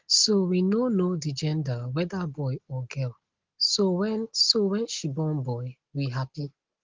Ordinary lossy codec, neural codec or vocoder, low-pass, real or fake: Opus, 16 kbps; none; 7.2 kHz; real